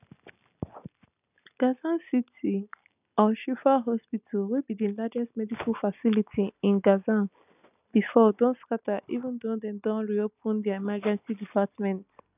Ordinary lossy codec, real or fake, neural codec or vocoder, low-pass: none; real; none; 3.6 kHz